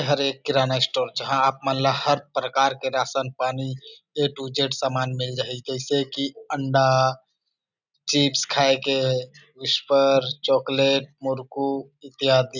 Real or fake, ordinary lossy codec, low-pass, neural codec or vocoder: real; none; 7.2 kHz; none